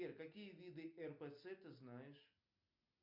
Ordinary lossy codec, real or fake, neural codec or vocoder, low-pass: MP3, 48 kbps; real; none; 5.4 kHz